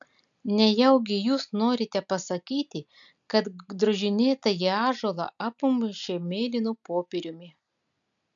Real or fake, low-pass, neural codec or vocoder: real; 7.2 kHz; none